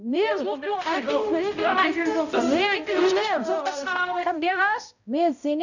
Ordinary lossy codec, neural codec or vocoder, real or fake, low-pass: none; codec, 16 kHz, 0.5 kbps, X-Codec, HuBERT features, trained on balanced general audio; fake; 7.2 kHz